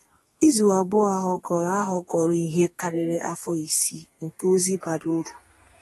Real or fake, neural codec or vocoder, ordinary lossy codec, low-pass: fake; codec, 32 kHz, 1.9 kbps, SNAC; AAC, 32 kbps; 14.4 kHz